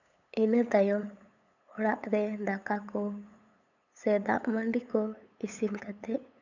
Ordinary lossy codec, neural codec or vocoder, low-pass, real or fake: none; codec, 16 kHz, 8 kbps, FunCodec, trained on LibriTTS, 25 frames a second; 7.2 kHz; fake